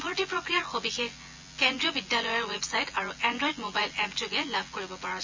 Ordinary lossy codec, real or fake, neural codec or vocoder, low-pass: MP3, 48 kbps; fake; vocoder, 24 kHz, 100 mel bands, Vocos; 7.2 kHz